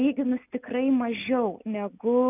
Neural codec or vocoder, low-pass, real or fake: none; 3.6 kHz; real